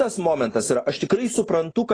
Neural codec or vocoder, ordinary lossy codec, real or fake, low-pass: none; AAC, 32 kbps; real; 9.9 kHz